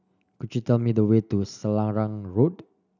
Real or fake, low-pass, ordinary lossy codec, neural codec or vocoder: real; 7.2 kHz; none; none